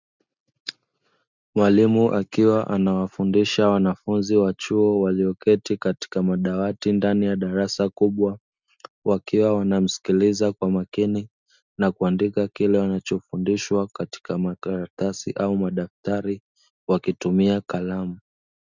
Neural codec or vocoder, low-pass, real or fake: none; 7.2 kHz; real